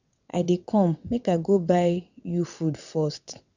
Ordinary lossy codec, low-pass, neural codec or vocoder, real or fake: none; 7.2 kHz; vocoder, 22.05 kHz, 80 mel bands, WaveNeXt; fake